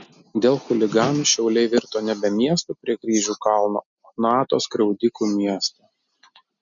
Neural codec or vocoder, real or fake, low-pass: none; real; 7.2 kHz